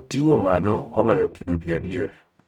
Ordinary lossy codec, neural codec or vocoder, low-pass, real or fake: none; codec, 44.1 kHz, 0.9 kbps, DAC; 19.8 kHz; fake